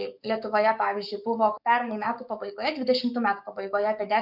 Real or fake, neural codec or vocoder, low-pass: fake; codec, 44.1 kHz, 7.8 kbps, DAC; 5.4 kHz